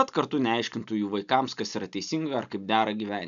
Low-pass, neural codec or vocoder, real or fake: 7.2 kHz; none; real